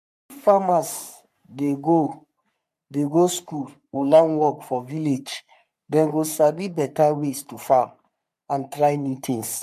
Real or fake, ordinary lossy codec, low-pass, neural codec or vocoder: fake; MP3, 96 kbps; 14.4 kHz; codec, 44.1 kHz, 3.4 kbps, Pupu-Codec